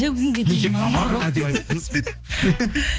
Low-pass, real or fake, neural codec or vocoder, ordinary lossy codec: none; fake; codec, 16 kHz, 2 kbps, X-Codec, HuBERT features, trained on balanced general audio; none